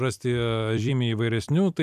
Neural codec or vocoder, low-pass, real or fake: vocoder, 44.1 kHz, 128 mel bands every 256 samples, BigVGAN v2; 14.4 kHz; fake